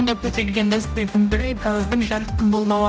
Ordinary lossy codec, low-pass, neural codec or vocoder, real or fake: none; none; codec, 16 kHz, 0.5 kbps, X-Codec, HuBERT features, trained on general audio; fake